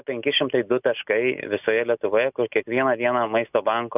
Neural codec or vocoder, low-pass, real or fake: none; 3.6 kHz; real